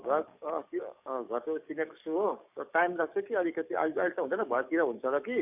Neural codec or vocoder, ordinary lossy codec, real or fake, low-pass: codec, 44.1 kHz, 7.8 kbps, DAC; none; fake; 3.6 kHz